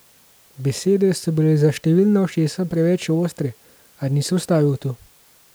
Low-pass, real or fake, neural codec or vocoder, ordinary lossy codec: none; real; none; none